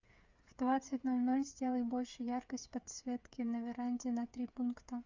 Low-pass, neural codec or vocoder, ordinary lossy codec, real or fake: 7.2 kHz; codec, 16 kHz, 8 kbps, FreqCodec, smaller model; Opus, 64 kbps; fake